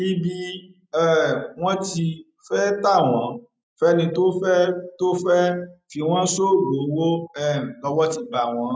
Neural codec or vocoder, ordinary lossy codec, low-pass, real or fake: none; none; none; real